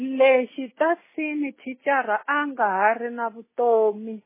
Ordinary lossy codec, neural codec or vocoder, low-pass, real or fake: MP3, 16 kbps; none; 3.6 kHz; real